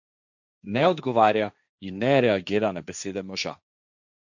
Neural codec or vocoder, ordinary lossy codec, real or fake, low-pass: codec, 16 kHz, 1.1 kbps, Voila-Tokenizer; none; fake; 7.2 kHz